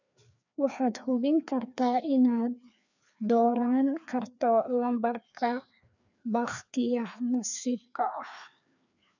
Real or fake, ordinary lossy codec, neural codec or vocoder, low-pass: fake; none; codec, 16 kHz, 2 kbps, FreqCodec, larger model; 7.2 kHz